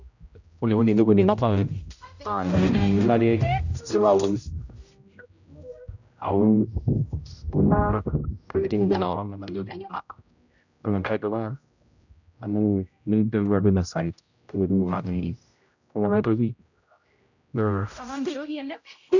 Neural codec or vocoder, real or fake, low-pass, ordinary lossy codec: codec, 16 kHz, 0.5 kbps, X-Codec, HuBERT features, trained on general audio; fake; 7.2 kHz; none